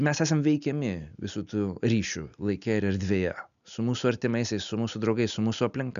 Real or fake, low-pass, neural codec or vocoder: real; 7.2 kHz; none